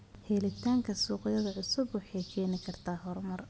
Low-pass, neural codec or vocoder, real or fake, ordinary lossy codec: none; none; real; none